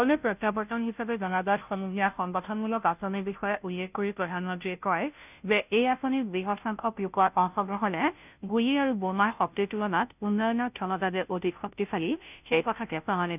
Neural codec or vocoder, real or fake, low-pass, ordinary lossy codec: codec, 16 kHz, 0.5 kbps, FunCodec, trained on Chinese and English, 25 frames a second; fake; 3.6 kHz; none